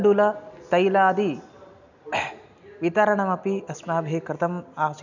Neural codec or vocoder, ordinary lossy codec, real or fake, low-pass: none; none; real; 7.2 kHz